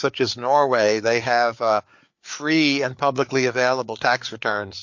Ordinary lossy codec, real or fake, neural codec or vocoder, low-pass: MP3, 48 kbps; fake; codec, 16 kHz, 4 kbps, FreqCodec, larger model; 7.2 kHz